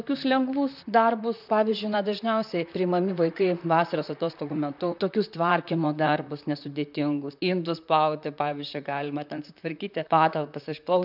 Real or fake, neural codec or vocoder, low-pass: fake; vocoder, 44.1 kHz, 128 mel bands, Pupu-Vocoder; 5.4 kHz